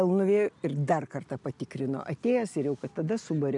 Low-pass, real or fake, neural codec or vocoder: 10.8 kHz; real; none